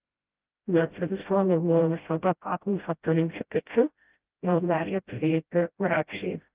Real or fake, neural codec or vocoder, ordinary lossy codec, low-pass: fake; codec, 16 kHz, 0.5 kbps, FreqCodec, smaller model; Opus, 32 kbps; 3.6 kHz